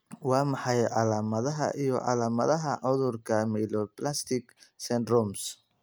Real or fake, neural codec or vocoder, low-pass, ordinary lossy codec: real; none; none; none